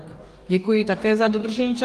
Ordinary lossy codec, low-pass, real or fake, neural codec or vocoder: Opus, 32 kbps; 14.4 kHz; fake; codec, 44.1 kHz, 2.6 kbps, DAC